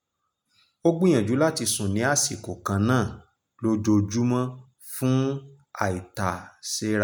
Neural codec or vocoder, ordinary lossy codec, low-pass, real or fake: none; none; none; real